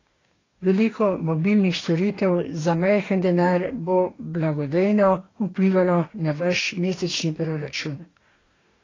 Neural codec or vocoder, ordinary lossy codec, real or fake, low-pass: codec, 44.1 kHz, 2.6 kbps, DAC; AAC, 32 kbps; fake; 7.2 kHz